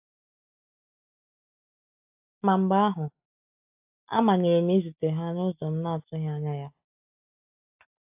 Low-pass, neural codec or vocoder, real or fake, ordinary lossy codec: 3.6 kHz; none; real; AAC, 32 kbps